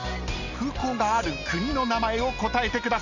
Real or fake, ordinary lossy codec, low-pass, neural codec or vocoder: real; none; 7.2 kHz; none